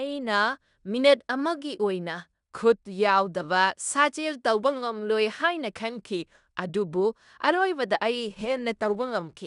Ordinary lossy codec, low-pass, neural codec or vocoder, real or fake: none; 10.8 kHz; codec, 16 kHz in and 24 kHz out, 0.9 kbps, LongCat-Audio-Codec, fine tuned four codebook decoder; fake